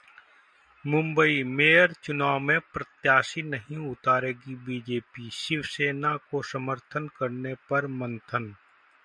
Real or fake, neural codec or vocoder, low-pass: real; none; 9.9 kHz